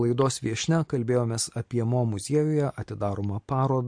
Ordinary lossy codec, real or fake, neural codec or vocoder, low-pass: MP3, 48 kbps; real; none; 9.9 kHz